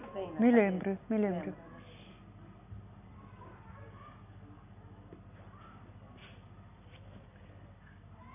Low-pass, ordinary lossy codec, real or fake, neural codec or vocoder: 3.6 kHz; none; real; none